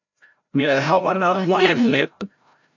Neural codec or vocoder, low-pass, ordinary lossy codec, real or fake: codec, 16 kHz, 0.5 kbps, FreqCodec, larger model; 7.2 kHz; MP3, 64 kbps; fake